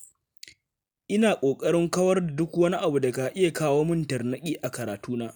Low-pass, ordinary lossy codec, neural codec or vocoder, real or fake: none; none; none; real